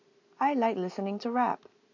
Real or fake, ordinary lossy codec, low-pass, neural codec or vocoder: real; AAC, 48 kbps; 7.2 kHz; none